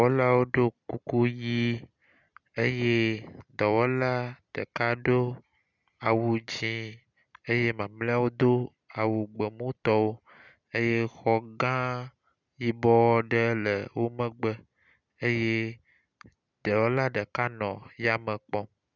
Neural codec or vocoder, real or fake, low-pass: none; real; 7.2 kHz